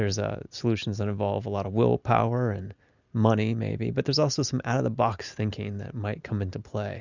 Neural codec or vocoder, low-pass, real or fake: none; 7.2 kHz; real